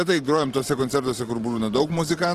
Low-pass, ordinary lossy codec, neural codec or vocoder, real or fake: 14.4 kHz; Opus, 16 kbps; vocoder, 44.1 kHz, 128 mel bands every 512 samples, BigVGAN v2; fake